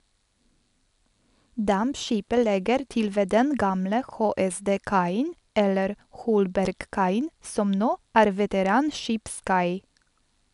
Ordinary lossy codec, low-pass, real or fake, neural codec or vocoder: none; 10.8 kHz; real; none